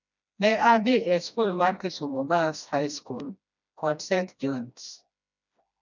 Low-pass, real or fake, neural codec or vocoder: 7.2 kHz; fake; codec, 16 kHz, 1 kbps, FreqCodec, smaller model